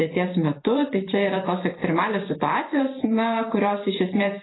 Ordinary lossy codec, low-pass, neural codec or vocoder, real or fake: AAC, 16 kbps; 7.2 kHz; vocoder, 44.1 kHz, 128 mel bands every 512 samples, BigVGAN v2; fake